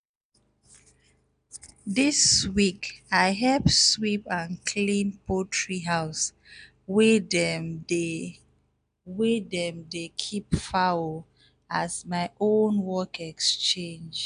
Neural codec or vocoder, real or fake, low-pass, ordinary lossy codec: none; real; 9.9 kHz; none